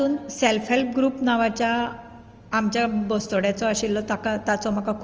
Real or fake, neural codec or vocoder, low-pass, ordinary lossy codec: real; none; 7.2 kHz; Opus, 24 kbps